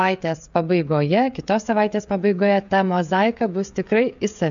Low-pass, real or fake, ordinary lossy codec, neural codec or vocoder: 7.2 kHz; fake; MP3, 64 kbps; codec, 16 kHz, 16 kbps, FreqCodec, smaller model